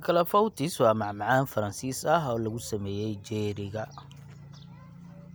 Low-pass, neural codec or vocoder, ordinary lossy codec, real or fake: none; none; none; real